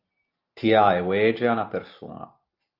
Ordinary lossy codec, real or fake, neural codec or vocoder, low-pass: Opus, 32 kbps; real; none; 5.4 kHz